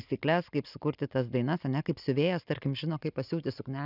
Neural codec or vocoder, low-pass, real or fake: none; 5.4 kHz; real